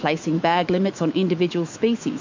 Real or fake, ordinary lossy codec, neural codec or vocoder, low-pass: fake; MP3, 48 kbps; autoencoder, 48 kHz, 128 numbers a frame, DAC-VAE, trained on Japanese speech; 7.2 kHz